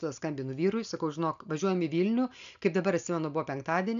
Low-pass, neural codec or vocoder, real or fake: 7.2 kHz; none; real